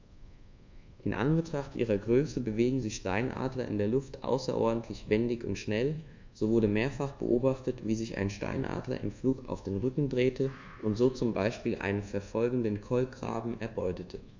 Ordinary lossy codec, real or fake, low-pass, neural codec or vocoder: MP3, 64 kbps; fake; 7.2 kHz; codec, 24 kHz, 1.2 kbps, DualCodec